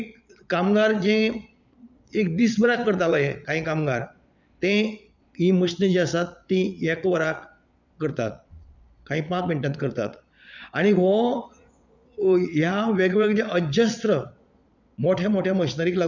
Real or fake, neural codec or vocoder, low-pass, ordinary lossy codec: fake; vocoder, 22.05 kHz, 80 mel bands, Vocos; 7.2 kHz; none